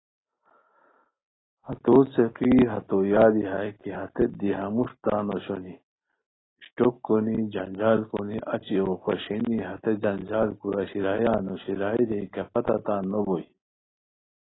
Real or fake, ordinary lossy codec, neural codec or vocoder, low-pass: real; AAC, 16 kbps; none; 7.2 kHz